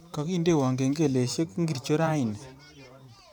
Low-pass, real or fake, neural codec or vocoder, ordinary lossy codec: none; fake; vocoder, 44.1 kHz, 128 mel bands every 512 samples, BigVGAN v2; none